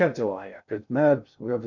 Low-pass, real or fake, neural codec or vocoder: 7.2 kHz; fake; codec, 16 kHz in and 24 kHz out, 0.6 kbps, FocalCodec, streaming, 2048 codes